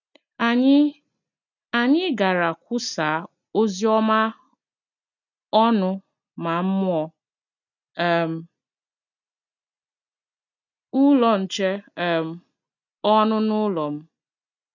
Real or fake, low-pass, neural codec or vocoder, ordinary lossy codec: real; 7.2 kHz; none; none